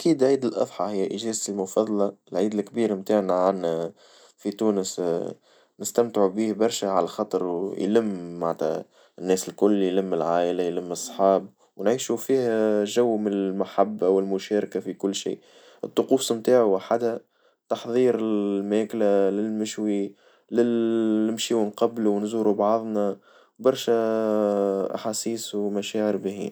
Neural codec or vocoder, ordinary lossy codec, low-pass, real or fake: none; none; none; real